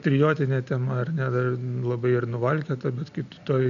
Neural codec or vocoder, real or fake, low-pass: none; real; 7.2 kHz